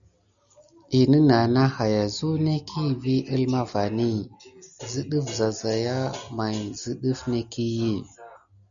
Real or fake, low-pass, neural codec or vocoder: real; 7.2 kHz; none